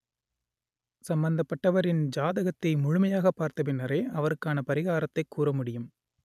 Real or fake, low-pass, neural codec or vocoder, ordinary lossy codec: real; 14.4 kHz; none; none